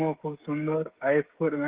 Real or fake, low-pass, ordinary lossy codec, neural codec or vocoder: fake; 3.6 kHz; Opus, 16 kbps; codec, 16 kHz, 16 kbps, FreqCodec, smaller model